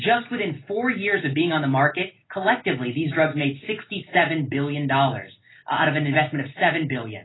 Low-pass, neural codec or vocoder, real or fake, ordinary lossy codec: 7.2 kHz; none; real; AAC, 16 kbps